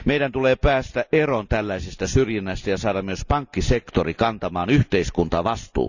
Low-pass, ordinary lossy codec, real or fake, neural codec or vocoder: 7.2 kHz; none; real; none